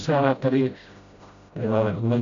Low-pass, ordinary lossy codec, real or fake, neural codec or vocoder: 7.2 kHz; MP3, 64 kbps; fake; codec, 16 kHz, 0.5 kbps, FreqCodec, smaller model